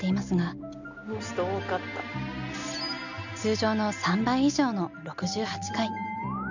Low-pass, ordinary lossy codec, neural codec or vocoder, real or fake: 7.2 kHz; none; none; real